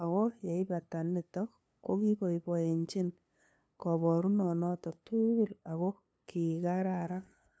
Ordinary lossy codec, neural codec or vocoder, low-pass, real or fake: none; codec, 16 kHz, 2 kbps, FunCodec, trained on LibriTTS, 25 frames a second; none; fake